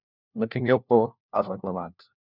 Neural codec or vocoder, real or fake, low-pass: codec, 16 kHz, 1 kbps, FunCodec, trained on LibriTTS, 50 frames a second; fake; 5.4 kHz